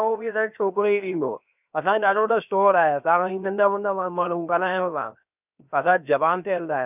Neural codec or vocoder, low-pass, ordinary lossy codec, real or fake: codec, 16 kHz, 0.7 kbps, FocalCodec; 3.6 kHz; none; fake